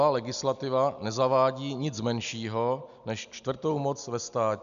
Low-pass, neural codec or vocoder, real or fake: 7.2 kHz; none; real